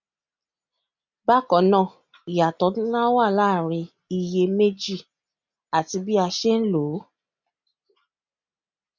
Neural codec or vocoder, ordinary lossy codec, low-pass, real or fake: none; none; 7.2 kHz; real